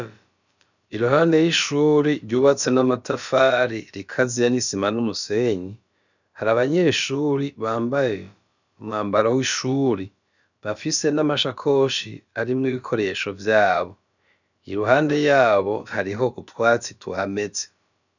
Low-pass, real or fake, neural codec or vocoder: 7.2 kHz; fake; codec, 16 kHz, about 1 kbps, DyCAST, with the encoder's durations